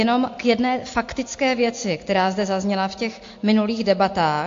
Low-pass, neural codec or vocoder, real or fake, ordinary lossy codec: 7.2 kHz; none; real; AAC, 48 kbps